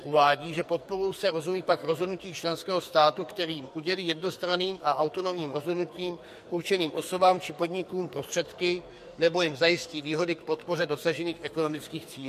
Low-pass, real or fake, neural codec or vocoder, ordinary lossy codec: 14.4 kHz; fake; codec, 44.1 kHz, 2.6 kbps, SNAC; MP3, 64 kbps